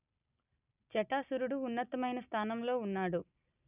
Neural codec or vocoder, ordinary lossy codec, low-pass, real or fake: none; none; 3.6 kHz; real